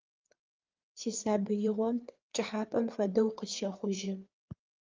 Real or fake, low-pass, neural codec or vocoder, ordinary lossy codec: fake; 7.2 kHz; codec, 16 kHz, 4 kbps, FreqCodec, larger model; Opus, 24 kbps